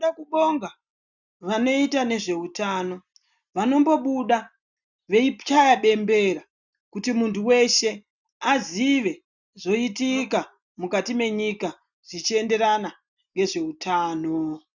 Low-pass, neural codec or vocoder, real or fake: 7.2 kHz; none; real